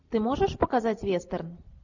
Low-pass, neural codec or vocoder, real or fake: 7.2 kHz; none; real